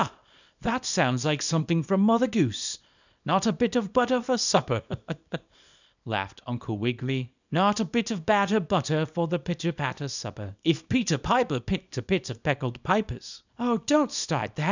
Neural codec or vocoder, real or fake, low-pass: codec, 24 kHz, 0.9 kbps, WavTokenizer, small release; fake; 7.2 kHz